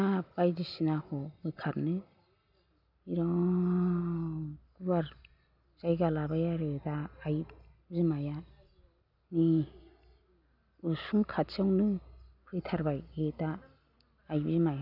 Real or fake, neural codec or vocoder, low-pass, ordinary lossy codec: real; none; 5.4 kHz; none